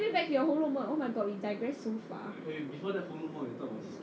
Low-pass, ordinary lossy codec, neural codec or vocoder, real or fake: none; none; none; real